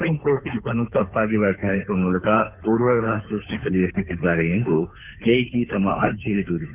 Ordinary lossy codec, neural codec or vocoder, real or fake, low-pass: none; codec, 16 kHz, 2 kbps, FunCodec, trained on Chinese and English, 25 frames a second; fake; 3.6 kHz